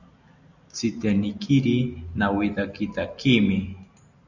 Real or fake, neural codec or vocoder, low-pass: real; none; 7.2 kHz